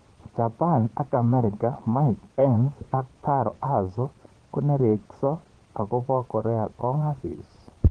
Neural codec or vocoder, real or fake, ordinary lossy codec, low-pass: vocoder, 22.05 kHz, 80 mel bands, Vocos; fake; Opus, 16 kbps; 9.9 kHz